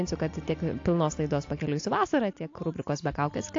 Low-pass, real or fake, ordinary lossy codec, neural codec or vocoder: 7.2 kHz; real; AAC, 48 kbps; none